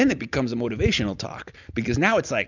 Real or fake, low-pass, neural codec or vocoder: real; 7.2 kHz; none